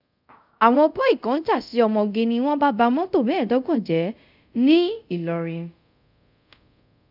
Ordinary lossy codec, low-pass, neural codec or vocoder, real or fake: none; 5.4 kHz; codec, 24 kHz, 0.5 kbps, DualCodec; fake